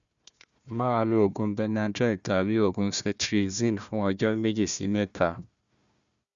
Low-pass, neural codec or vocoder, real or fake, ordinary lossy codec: 7.2 kHz; codec, 16 kHz, 1 kbps, FunCodec, trained on Chinese and English, 50 frames a second; fake; Opus, 64 kbps